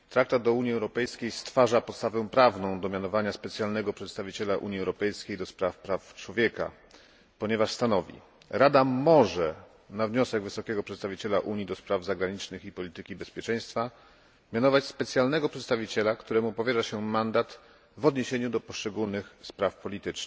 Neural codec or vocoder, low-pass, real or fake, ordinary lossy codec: none; none; real; none